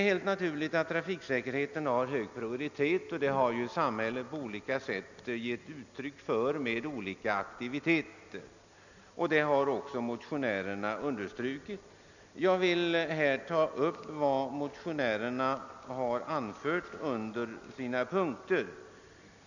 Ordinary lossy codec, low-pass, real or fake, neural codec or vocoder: none; 7.2 kHz; real; none